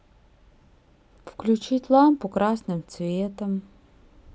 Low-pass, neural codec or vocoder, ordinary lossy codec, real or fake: none; none; none; real